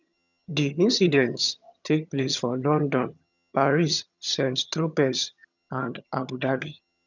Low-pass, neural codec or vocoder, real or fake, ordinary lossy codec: 7.2 kHz; vocoder, 22.05 kHz, 80 mel bands, HiFi-GAN; fake; none